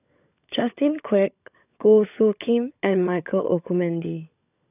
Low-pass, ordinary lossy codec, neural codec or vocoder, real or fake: 3.6 kHz; none; codec, 16 kHz, 16 kbps, FunCodec, trained on LibriTTS, 50 frames a second; fake